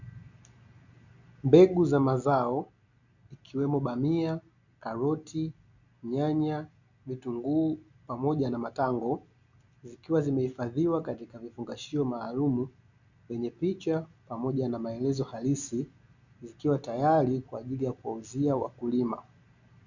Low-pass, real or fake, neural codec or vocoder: 7.2 kHz; real; none